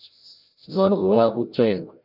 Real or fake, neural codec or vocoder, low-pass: fake; codec, 16 kHz, 0.5 kbps, FreqCodec, larger model; 5.4 kHz